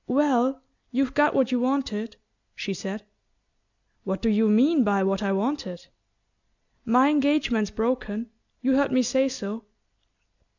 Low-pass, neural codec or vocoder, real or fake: 7.2 kHz; none; real